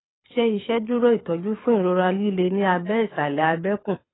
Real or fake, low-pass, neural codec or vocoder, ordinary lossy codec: fake; 7.2 kHz; codec, 24 kHz, 6 kbps, HILCodec; AAC, 16 kbps